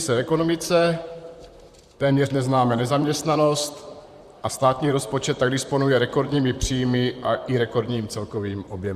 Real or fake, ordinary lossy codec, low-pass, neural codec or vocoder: fake; Opus, 64 kbps; 14.4 kHz; vocoder, 44.1 kHz, 128 mel bands, Pupu-Vocoder